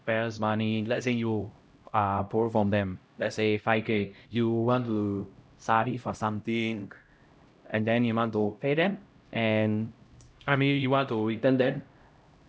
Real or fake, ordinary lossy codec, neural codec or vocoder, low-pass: fake; none; codec, 16 kHz, 0.5 kbps, X-Codec, HuBERT features, trained on LibriSpeech; none